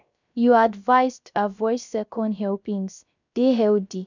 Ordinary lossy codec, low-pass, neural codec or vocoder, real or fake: none; 7.2 kHz; codec, 16 kHz, 0.3 kbps, FocalCodec; fake